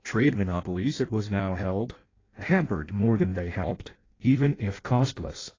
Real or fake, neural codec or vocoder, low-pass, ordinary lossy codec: fake; codec, 16 kHz in and 24 kHz out, 0.6 kbps, FireRedTTS-2 codec; 7.2 kHz; AAC, 32 kbps